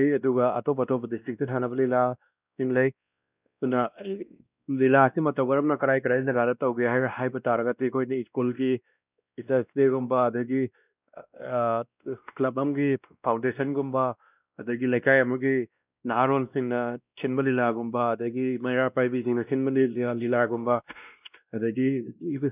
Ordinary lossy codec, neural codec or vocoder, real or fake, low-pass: none; codec, 16 kHz, 1 kbps, X-Codec, WavLM features, trained on Multilingual LibriSpeech; fake; 3.6 kHz